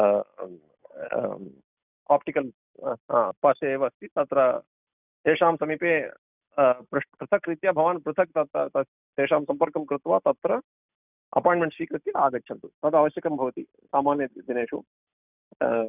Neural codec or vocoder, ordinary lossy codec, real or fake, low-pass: none; none; real; 3.6 kHz